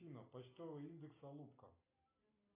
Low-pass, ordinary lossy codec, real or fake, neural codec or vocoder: 3.6 kHz; AAC, 16 kbps; real; none